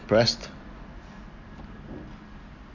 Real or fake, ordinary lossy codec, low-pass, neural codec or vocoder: real; none; 7.2 kHz; none